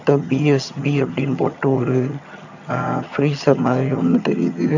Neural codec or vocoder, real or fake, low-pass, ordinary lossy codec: vocoder, 22.05 kHz, 80 mel bands, HiFi-GAN; fake; 7.2 kHz; none